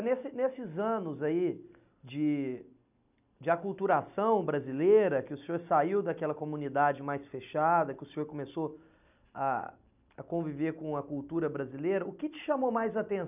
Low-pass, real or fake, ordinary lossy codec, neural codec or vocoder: 3.6 kHz; real; none; none